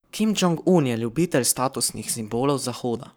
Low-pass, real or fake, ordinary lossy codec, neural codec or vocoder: none; fake; none; codec, 44.1 kHz, 7.8 kbps, Pupu-Codec